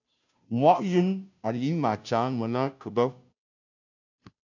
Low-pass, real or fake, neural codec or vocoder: 7.2 kHz; fake; codec, 16 kHz, 0.5 kbps, FunCodec, trained on Chinese and English, 25 frames a second